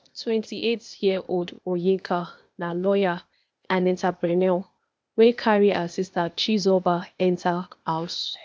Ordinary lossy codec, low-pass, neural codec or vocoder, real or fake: none; none; codec, 16 kHz, 0.8 kbps, ZipCodec; fake